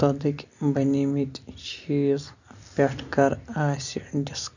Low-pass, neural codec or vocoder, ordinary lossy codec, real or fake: 7.2 kHz; none; none; real